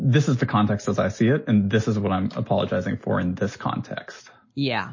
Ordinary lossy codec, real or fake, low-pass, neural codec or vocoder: MP3, 32 kbps; real; 7.2 kHz; none